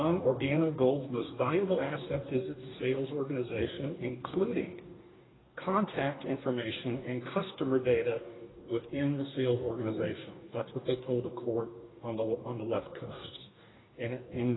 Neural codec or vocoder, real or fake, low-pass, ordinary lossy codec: codec, 44.1 kHz, 2.6 kbps, DAC; fake; 7.2 kHz; AAC, 16 kbps